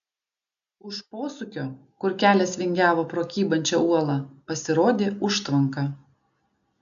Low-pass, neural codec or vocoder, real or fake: 7.2 kHz; none; real